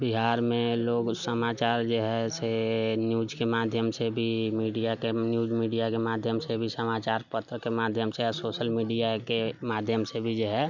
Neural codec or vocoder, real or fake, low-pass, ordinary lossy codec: none; real; 7.2 kHz; none